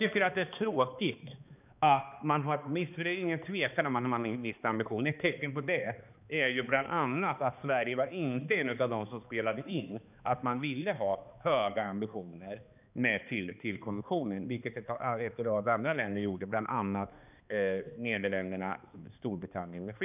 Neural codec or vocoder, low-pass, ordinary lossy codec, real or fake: codec, 16 kHz, 2 kbps, X-Codec, HuBERT features, trained on balanced general audio; 3.6 kHz; none; fake